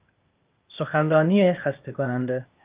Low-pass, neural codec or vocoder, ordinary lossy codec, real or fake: 3.6 kHz; codec, 16 kHz, 0.8 kbps, ZipCodec; Opus, 24 kbps; fake